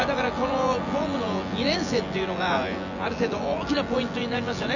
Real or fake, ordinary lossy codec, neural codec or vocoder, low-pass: fake; none; vocoder, 24 kHz, 100 mel bands, Vocos; 7.2 kHz